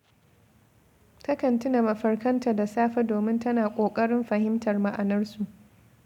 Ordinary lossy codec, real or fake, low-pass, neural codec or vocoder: none; real; 19.8 kHz; none